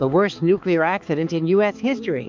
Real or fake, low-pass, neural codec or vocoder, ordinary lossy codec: fake; 7.2 kHz; codec, 16 kHz, 4 kbps, X-Codec, HuBERT features, trained on balanced general audio; MP3, 64 kbps